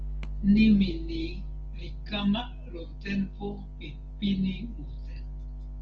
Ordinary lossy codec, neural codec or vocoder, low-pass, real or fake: Opus, 16 kbps; none; 7.2 kHz; real